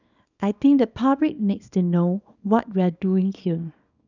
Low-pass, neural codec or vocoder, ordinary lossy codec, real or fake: 7.2 kHz; codec, 24 kHz, 0.9 kbps, WavTokenizer, small release; none; fake